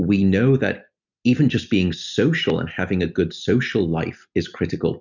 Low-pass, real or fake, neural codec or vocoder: 7.2 kHz; real; none